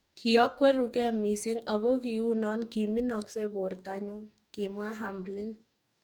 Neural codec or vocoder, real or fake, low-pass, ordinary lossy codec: codec, 44.1 kHz, 2.6 kbps, DAC; fake; 19.8 kHz; none